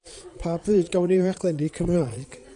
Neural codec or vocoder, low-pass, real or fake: vocoder, 22.05 kHz, 80 mel bands, Vocos; 9.9 kHz; fake